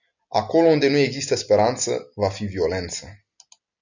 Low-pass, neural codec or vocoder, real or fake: 7.2 kHz; none; real